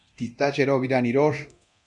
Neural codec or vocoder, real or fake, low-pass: codec, 24 kHz, 0.9 kbps, DualCodec; fake; 10.8 kHz